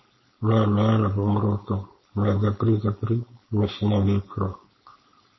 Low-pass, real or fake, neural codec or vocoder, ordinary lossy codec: 7.2 kHz; fake; codec, 16 kHz, 4.8 kbps, FACodec; MP3, 24 kbps